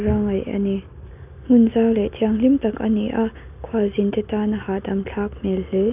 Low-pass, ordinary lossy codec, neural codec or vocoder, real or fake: 3.6 kHz; none; none; real